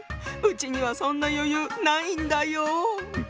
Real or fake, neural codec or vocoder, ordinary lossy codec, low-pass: real; none; none; none